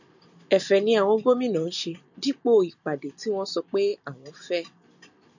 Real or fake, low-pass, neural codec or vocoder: real; 7.2 kHz; none